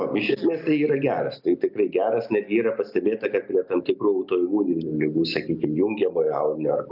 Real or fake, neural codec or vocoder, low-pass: real; none; 5.4 kHz